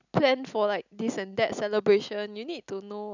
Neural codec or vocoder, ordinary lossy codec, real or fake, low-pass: none; none; real; 7.2 kHz